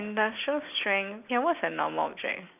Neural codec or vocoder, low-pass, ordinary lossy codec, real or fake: none; 3.6 kHz; none; real